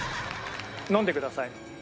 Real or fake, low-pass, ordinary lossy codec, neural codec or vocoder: real; none; none; none